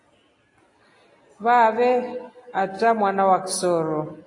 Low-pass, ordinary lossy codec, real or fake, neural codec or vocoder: 10.8 kHz; AAC, 32 kbps; real; none